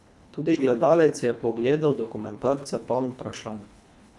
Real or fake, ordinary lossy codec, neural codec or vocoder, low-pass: fake; none; codec, 24 kHz, 1.5 kbps, HILCodec; none